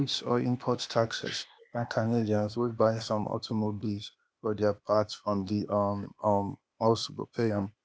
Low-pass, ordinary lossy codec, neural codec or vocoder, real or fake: none; none; codec, 16 kHz, 0.8 kbps, ZipCodec; fake